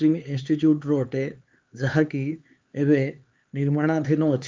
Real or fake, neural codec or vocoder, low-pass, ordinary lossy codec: fake; codec, 16 kHz, 4 kbps, X-Codec, HuBERT features, trained on LibriSpeech; 7.2 kHz; Opus, 32 kbps